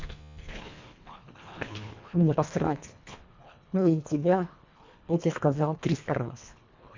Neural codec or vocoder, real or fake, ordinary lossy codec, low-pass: codec, 24 kHz, 1.5 kbps, HILCodec; fake; MP3, 64 kbps; 7.2 kHz